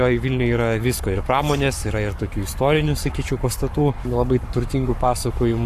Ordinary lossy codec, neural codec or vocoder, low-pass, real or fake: AAC, 96 kbps; codec, 44.1 kHz, 7.8 kbps, Pupu-Codec; 14.4 kHz; fake